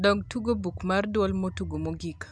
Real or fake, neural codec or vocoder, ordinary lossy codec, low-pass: real; none; none; none